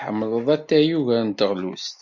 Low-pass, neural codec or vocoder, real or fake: 7.2 kHz; none; real